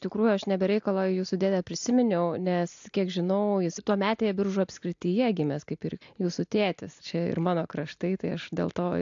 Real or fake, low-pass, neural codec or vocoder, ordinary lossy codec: real; 7.2 kHz; none; AAC, 64 kbps